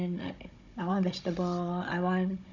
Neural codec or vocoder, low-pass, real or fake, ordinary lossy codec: codec, 16 kHz, 16 kbps, FunCodec, trained on Chinese and English, 50 frames a second; 7.2 kHz; fake; none